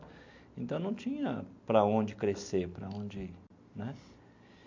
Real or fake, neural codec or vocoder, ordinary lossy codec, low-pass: real; none; MP3, 64 kbps; 7.2 kHz